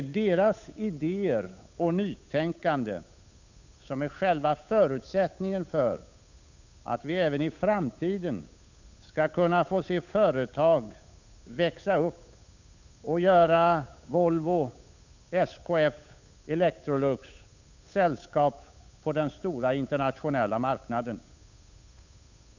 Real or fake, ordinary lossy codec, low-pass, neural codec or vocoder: fake; none; 7.2 kHz; codec, 16 kHz, 8 kbps, FunCodec, trained on Chinese and English, 25 frames a second